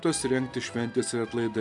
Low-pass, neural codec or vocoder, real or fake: 10.8 kHz; none; real